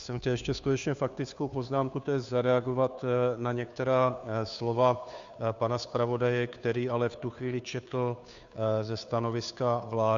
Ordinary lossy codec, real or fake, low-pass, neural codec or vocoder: Opus, 64 kbps; fake; 7.2 kHz; codec, 16 kHz, 2 kbps, FunCodec, trained on Chinese and English, 25 frames a second